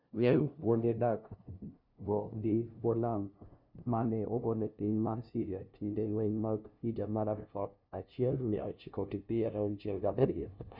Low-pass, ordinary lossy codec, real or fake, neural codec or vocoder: 5.4 kHz; none; fake; codec, 16 kHz, 0.5 kbps, FunCodec, trained on LibriTTS, 25 frames a second